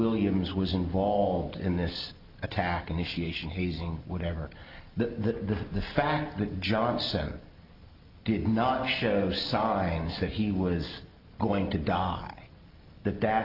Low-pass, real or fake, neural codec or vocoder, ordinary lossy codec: 5.4 kHz; real; none; Opus, 32 kbps